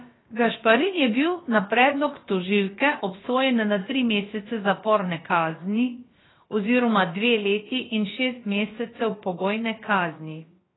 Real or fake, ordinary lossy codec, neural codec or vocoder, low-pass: fake; AAC, 16 kbps; codec, 16 kHz, about 1 kbps, DyCAST, with the encoder's durations; 7.2 kHz